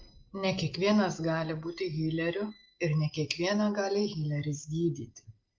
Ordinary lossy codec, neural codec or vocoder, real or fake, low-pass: Opus, 32 kbps; none; real; 7.2 kHz